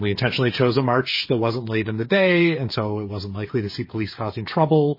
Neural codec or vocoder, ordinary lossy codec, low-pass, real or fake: codec, 16 kHz, 8 kbps, FreqCodec, smaller model; MP3, 24 kbps; 5.4 kHz; fake